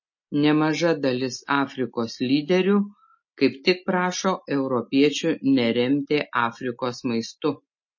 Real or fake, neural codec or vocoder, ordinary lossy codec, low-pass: real; none; MP3, 32 kbps; 7.2 kHz